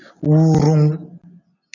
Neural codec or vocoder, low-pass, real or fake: none; 7.2 kHz; real